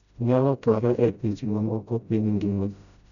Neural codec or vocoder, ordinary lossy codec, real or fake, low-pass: codec, 16 kHz, 0.5 kbps, FreqCodec, smaller model; none; fake; 7.2 kHz